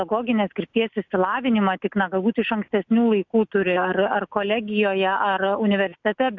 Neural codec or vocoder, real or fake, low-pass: none; real; 7.2 kHz